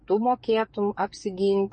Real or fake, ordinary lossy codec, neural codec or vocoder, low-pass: fake; MP3, 32 kbps; codec, 44.1 kHz, 7.8 kbps, DAC; 10.8 kHz